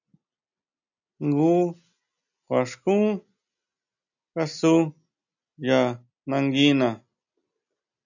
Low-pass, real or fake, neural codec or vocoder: 7.2 kHz; real; none